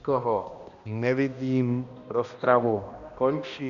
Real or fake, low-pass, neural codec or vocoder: fake; 7.2 kHz; codec, 16 kHz, 1 kbps, X-Codec, HuBERT features, trained on balanced general audio